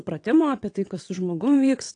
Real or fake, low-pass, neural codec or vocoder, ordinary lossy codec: fake; 9.9 kHz; vocoder, 22.05 kHz, 80 mel bands, WaveNeXt; AAC, 48 kbps